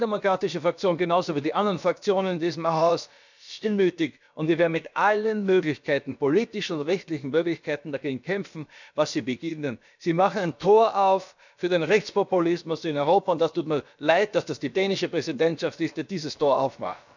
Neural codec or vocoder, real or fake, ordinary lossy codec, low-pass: codec, 16 kHz, about 1 kbps, DyCAST, with the encoder's durations; fake; none; 7.2 kHz